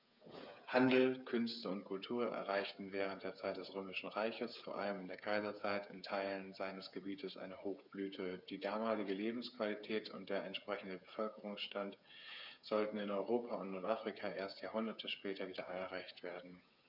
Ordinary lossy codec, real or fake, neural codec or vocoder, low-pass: none; fake; codec, 16 kHz, 8 kbps, FreqCodec, smaller model; 5.4 kHz